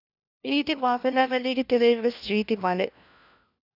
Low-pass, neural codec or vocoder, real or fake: 5.4 kHz; codec, 16 kHz, 1 kbps, FunCodec, trained on LibriTTS, 50 frames a second; fake